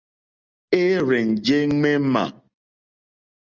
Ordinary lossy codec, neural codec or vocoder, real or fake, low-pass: Opus, 32 kbps; none; real; 7.2 kHz